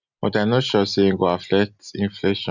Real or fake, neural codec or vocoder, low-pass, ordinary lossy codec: real; none; 7.2 kHz; none